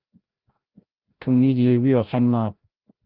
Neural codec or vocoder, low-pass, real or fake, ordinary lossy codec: codec, 16 kHz, 0.5 kbps, FreqCodec, larger model; 5.4 kHz; fake; Opus, 24 kbps